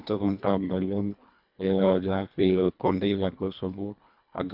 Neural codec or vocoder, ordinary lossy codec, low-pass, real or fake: codec, 24 kHz, 1.5 kbps, HILCodec; none; 5.4 kHz; fake